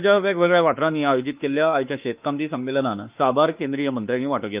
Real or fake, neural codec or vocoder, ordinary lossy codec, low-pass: fake; autoencoder, 48 kHz, 32 numbers a frame, DAC-VAE, trained on Japanese speech; Opus, 32 kbps; 3.6 kHz